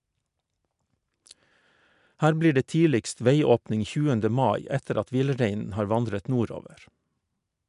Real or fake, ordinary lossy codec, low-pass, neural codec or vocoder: real; MP3, 96 kbps; 10.8 kHz; none